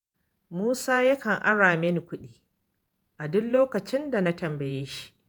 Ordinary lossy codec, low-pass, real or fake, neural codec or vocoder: none; none; fake; vocoder, 48 kHz, 128 mel bands, Vocos